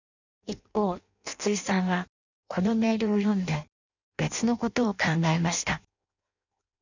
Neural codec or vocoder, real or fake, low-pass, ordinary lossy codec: codec, 16 kHz in and 24 kHz out, 0.6 kbps, FireRedTTS-2 codec; fake; 7.2 kHz; none